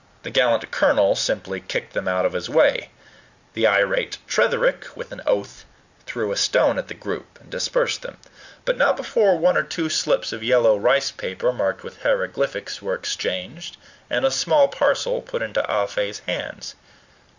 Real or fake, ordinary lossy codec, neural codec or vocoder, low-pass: real; Opus, 64 kbps; none; 7.2 kHz